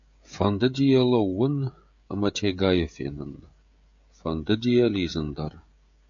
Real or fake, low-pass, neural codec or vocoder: fake; 7.2 kHz; codec, 16 kHz, 16 kbps, FreqCodec, smaller model